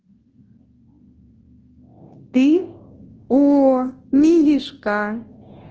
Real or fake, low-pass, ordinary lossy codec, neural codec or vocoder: fake; 7.2 kHz; Opus, 16 kbps; codec, 24 kHz, 0.9 kbps, WavTokenizer, large speech release